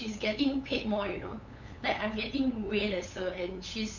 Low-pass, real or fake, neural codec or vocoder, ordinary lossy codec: 7.2 kHz; fake; codec, 16 kHz, 8 kbps, FunCodec, trained on LibriTTS, 25 frames a second; none